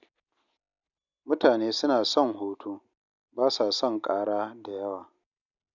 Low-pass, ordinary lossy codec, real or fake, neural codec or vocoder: 7.2 kHz; none; real; none